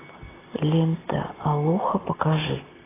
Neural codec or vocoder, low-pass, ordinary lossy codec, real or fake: none; 3.6 kHz; AAC, 16 kbps; real